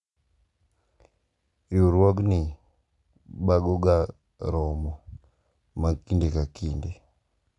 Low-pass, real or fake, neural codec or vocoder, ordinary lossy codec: 10.8 kHz; real; none; none